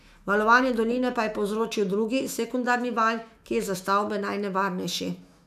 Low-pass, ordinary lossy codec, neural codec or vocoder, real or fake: 14.4 kHz; none; autoencoder, 48 kHz, 128 numbers a frame, DAC-VAE, trained on Japanese speech; fake